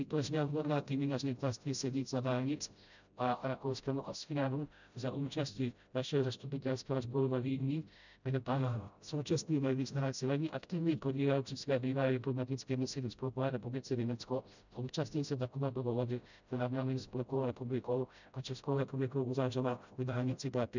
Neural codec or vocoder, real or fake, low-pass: codec, 16 kHz, 0.5 kbps, FreqCodec, smaller model; fake; 7.2 kHz